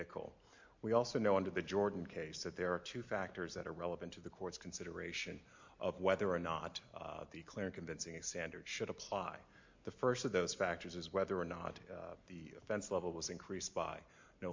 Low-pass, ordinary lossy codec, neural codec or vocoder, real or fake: 7.2 kHz; MP3, 48 kbps; none; real